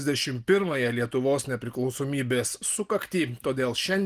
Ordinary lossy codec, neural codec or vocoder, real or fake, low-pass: Opus, 24 kbps; none; real; 14.4 kHz